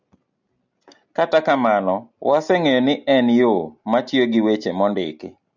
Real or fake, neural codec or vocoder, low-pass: real; none; 7.2 kHz